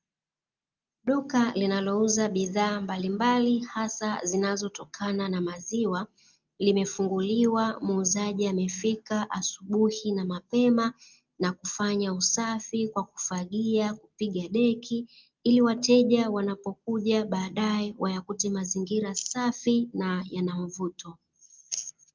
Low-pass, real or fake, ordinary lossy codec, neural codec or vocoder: 7.2 kHz; real; Opus, 24 kbps; none